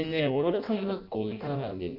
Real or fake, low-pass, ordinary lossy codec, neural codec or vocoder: fake; 5.4 kHz; none; codec, 16 kHz in and 24 kHz out, 0.6 kbps, FireRedTTS-2 codec